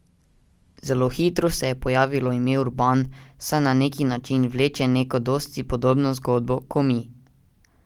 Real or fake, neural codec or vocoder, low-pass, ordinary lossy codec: real; none; 19.8 kHz; Opus, 24 kbps